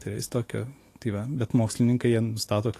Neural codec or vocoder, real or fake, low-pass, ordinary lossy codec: autoencoder, 48 kHz, 128 numbers a frame, DAC-VAE, trained on Japanese speech; fake; 14.4 kHz; AAC, 48 kbps